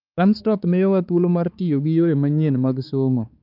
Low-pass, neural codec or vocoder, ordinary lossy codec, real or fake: 5.4 kHz; codec, 16 kHz, 4 kbps, X-Codec, HuBERT features, trained on balanced general audio; Opus, 24 kbps; fake